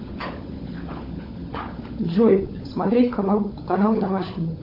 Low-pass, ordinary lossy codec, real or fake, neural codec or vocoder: 5.4 kHz; AAC, 32 kbps; fake; codec, 16 kHz, 16 kbps, FunCodec, trained on LibriTTS, 50 frames a second